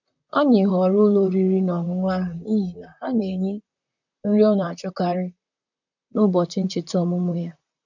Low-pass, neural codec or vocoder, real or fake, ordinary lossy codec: 7.2 kHz; vocoder, 44.1 kHz, 128 mel bands, Pupu-Vocoder; fake; none